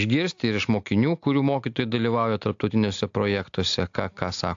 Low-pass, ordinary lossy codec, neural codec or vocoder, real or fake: 7.2 kHz; AAC, 64 kbps; none; real